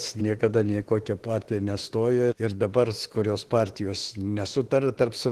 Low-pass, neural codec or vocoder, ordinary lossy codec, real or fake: 14.4 kHz; autoencoder, 48 kHz, 32 numbers a frame, DAC-VAE, trained on Japanese speech; Opus, 16 kbps; fake